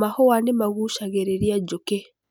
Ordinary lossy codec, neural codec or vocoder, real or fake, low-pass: none; none; real; none